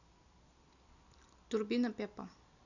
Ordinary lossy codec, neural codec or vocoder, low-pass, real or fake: none; none; 7.2 kHz; real